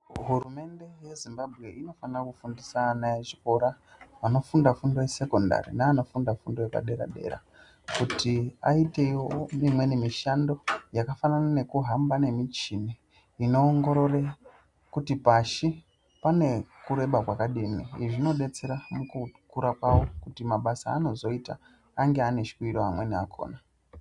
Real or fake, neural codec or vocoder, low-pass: real; none; 10.8 kHz